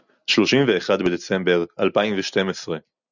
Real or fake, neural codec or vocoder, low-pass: real; none; 7.2 kHz